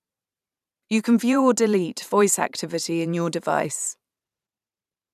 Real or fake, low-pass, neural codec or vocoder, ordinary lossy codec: fake; 14.4 kHz; vocoder, 44.1 kHz, 128 mel bands every 512 samples, BigVGAN v2; none